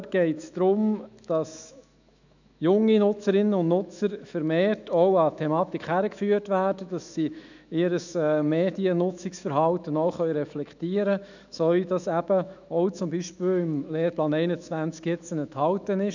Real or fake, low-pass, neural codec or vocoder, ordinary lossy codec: fake; 7.2 kHz; autoencoder, 48 kHz, 128 numbers a frame, DAC-VAE, trained on Japanese speech; none